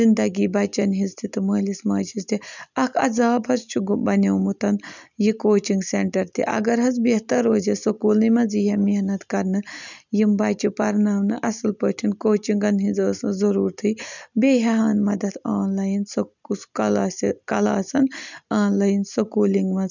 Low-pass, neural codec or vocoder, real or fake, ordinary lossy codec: 7.2 kHz; none; real; none